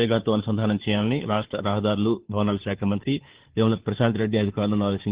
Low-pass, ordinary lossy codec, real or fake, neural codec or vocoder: 3.6 kHz; Opus, 16 kbps; fake; codec, 16 kHz, 2 kbps, FunCodec, trained on Chinese and English, 25 frames a second